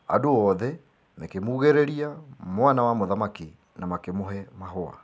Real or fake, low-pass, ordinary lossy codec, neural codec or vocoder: real; none; none; none